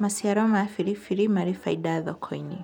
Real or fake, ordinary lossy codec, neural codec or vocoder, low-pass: real; none; none; 19.8 kHz